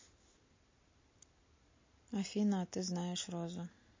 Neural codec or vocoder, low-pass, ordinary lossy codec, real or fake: none; 7.2 kHz; MP3, 32 kbps; real